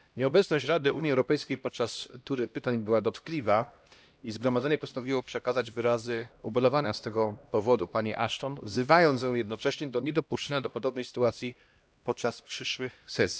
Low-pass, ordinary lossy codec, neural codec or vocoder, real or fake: none; none; codec, 16 kHz, 1 kbps, X-Codec, HuBERT features, trained on LibriSpeech; fake